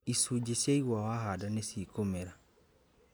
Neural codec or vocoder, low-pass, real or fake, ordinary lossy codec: none; none; real; none